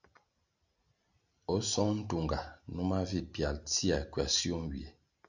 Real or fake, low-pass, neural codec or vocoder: real; 7.2 kHz; none